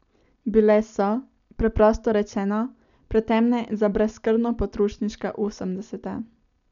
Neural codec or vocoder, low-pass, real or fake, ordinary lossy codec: none; 7.2 kHz; real; none